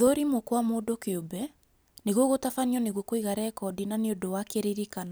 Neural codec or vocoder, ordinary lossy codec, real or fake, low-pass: none; none; real; none